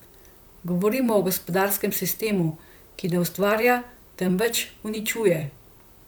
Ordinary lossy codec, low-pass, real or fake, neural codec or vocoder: none; none; fake; vocoder, 44.1 kHz, 128 mel bands, Pupu-Vocoder